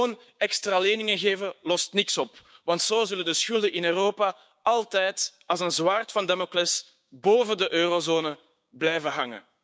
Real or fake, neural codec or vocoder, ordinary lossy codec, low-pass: fake; codec, 16 kHz, 6 kbps, DAC; none; none